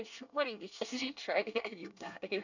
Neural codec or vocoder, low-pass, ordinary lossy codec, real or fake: codec, 24 kHz, 1 kbps, SNAC; 7.2 kHz; none; fake